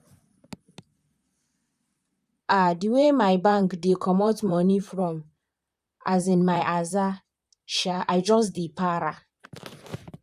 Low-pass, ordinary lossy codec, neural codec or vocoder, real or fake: 14.4 kHz; none; vocoder, 44.1 kHz, 128 mel bands, Pupu-Vocoder; fake